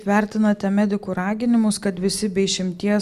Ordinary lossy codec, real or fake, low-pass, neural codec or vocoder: Opus, 64 kbps; real; 14.4 kHz; none